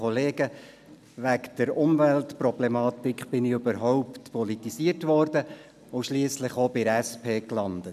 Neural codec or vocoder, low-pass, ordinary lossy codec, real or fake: none; 14.4 kHz; none; real